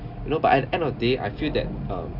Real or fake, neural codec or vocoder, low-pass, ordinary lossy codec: real; none; 5.4 kHz; none